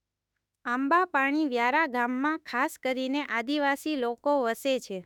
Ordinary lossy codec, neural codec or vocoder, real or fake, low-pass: none; autoencoder, 48 kHz, 32 numbers a frame, DAC-VAE, trained on Japanese speech; fake; 19.8 kHz